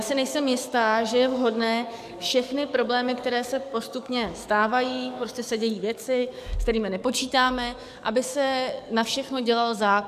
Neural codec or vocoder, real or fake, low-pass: codec, 44.1 kHz, 7.8 kbps, DAC; fake; 14.4 kHz